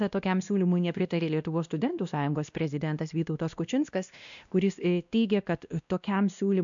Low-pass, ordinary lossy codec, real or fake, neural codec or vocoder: 7.2 kHz; MP3, 96 kbps; fake; codec, 16 kHz, 1 kbps, X-Codec, WavLM features, trained on Multilingual LibriSpeech